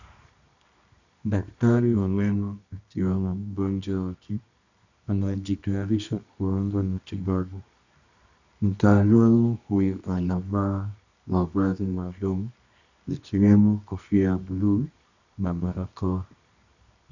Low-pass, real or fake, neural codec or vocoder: 7.2 kHz; fake; codec, 24 kHz, 0.9 kbps, WavTokenizer, medium music audio release